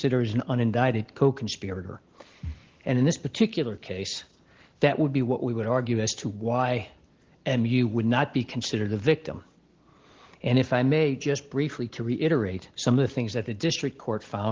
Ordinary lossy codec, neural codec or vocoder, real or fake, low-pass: Opus, 16 kbps; none; real; 7.2 kHz